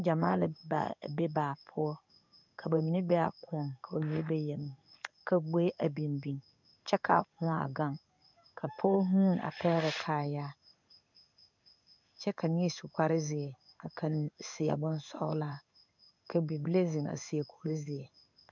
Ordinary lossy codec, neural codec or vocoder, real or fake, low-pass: MP3, 64 kbps; codec, 16 kHz in and 24 kHz out, 1 kbps, XY-Tokenizer; fake; 7.2 kHz